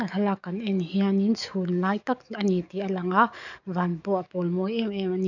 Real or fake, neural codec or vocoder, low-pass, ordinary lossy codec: fake; codec, 44.1 kHz, 7.8 kbps, DAC; 7.2 kHz; none